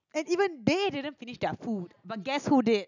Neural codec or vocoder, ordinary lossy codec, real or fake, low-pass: none; none; real; 7.2 kHz